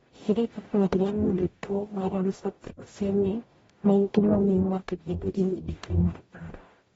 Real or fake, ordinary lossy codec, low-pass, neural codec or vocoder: fake; AAC, 24 kbps; 19.8 kHz; codec, 44.1 kHz, 0.9 kbps, DAC